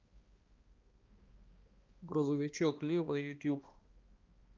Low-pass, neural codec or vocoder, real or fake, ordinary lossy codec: 7.2 kHz; codec, 16 kHz, 1 kbps, X-Codec, HuBERT features, trained on balanced general audio; fake; Opus, 32 kbps